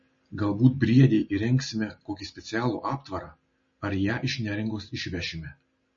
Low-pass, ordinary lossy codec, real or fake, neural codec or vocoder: 7.2 kHz; MP3, 32 kbps; real; none